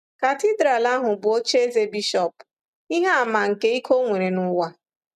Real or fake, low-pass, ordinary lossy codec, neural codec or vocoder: real; 14.4 kHz; none; none